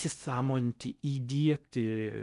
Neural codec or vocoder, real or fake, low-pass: codec, 16 kHz in and 24 kHz out, 0.6 kbps, FocalCodec, streaming, 4096 codes; fake; 10.8 kHz